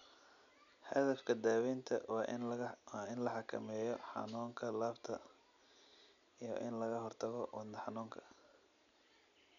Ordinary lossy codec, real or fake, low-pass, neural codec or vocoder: none; real; 7.2 kHz; none